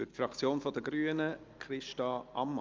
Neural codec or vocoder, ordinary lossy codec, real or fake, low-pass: none; Opus, 24 kbps; real; 7.2 kHz